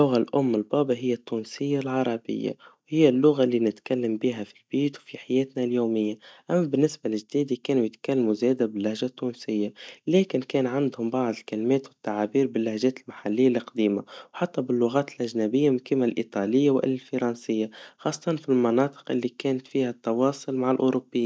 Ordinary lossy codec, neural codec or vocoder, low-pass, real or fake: none; none; none; real